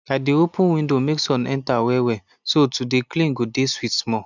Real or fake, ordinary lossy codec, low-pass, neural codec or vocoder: real; none; 7.2 kHz; none